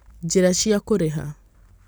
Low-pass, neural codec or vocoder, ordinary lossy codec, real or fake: none; none; none; real